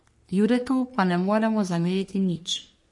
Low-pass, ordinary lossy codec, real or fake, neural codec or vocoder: 10.8 kHz; MP3, 48 kbps; fake; codec, 44.1 kHz, 3.4 kbps, Pupu-Codec